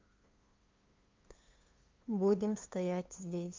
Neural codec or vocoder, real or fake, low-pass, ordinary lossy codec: codec, 16 kHz, 2 kbps, FunCodec, trained on LibriTTS, 25 frames a second; fake; 7.2 kHz; Opus, 24 kbps